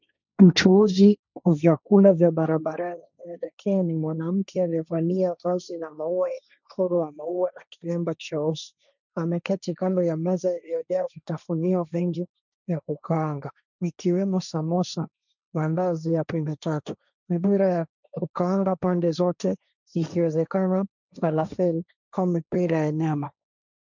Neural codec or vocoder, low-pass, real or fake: codec, 16 kHz, 1.1 kbps, Voila-Tokenizer; 7.2 kHz; fake